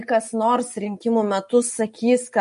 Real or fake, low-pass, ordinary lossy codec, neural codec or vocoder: real; 10.8 kHz; MP3, 48 kbps; none